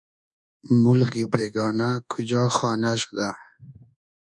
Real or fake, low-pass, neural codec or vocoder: fake; 10.8 kHz; codec, 24 kHz, 1.2 kbps, DualCodec